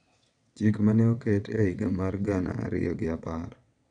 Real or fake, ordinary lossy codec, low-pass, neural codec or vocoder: fake; none; 9.9 kHz; vocoder, 22.05 kHz, 80 mel bands, WaveNeXt